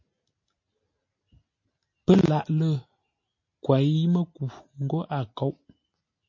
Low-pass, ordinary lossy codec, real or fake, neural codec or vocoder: 7.2 kHz; MP3, 32 kbps; real; none